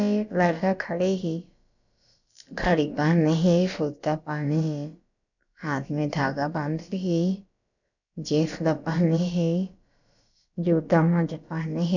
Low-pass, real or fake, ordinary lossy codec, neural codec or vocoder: 7.2 kHz; fake; none; codec, 16 kHz, about 1 kbps, DyCAST, with the encoder's durations